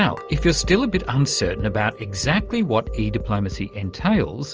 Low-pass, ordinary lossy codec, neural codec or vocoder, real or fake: 7.2 kHz; Opus, 24 kbps; none; real